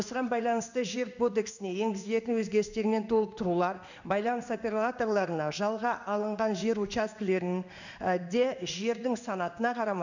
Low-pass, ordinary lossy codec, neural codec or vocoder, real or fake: 7.2 kHz; none; codec, 16 kHz in and 24 kHz out, 1 kbps, XY-Tokenizer; fake